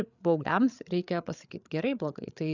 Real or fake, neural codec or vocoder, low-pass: fake; codec, 16 kHz, 8 kbps, FreqCodec, larger model; 7.2 kHz